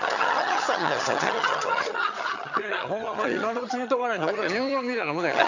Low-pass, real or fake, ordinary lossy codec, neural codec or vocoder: 7.2 kHz; fake; none; vocoder, 22.05 kHz, 80 mel bands, HiFi-GAN